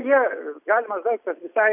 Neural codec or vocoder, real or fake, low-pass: none; real; 3.6 kHz